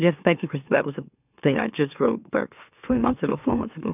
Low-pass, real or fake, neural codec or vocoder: 3.6 kHz; fake; autoencoder, 44.1 kHz, a latent of 192 numbers a frame, MeloTTS